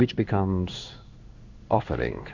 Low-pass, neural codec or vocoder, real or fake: 7.2 kHz; codec, 16 kHz in and 24 kHz out, 1 kbps, XY-Tokenizer; fake